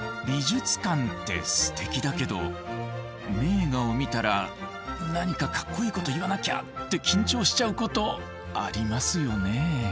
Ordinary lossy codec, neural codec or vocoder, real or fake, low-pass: none; none; real; none